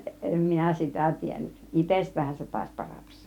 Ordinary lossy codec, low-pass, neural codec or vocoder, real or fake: none; 19.8 kHz; vocoder, 44.1 kHz, 128 mel bands every 512 samples, BigVGAN v2; fake